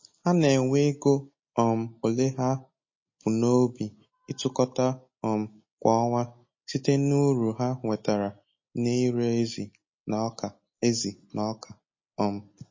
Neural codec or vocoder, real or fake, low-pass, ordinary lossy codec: none; real; 7.2 kHz; MP3, 32 kbps